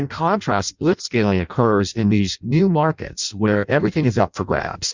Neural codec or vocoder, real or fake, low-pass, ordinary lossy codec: codec, 16 kHz in and 24 kHz out, 0.6 kbps, FireRedTTS-2 codec; fake; 7.2 kHz; Opus, 64 kbps